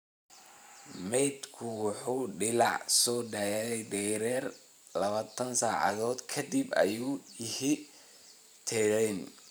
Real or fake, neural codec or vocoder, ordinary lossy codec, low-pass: fake; vocoder, 44.1 kHz, 128 mel bands every 512 samples, BigVGAN v2; none; none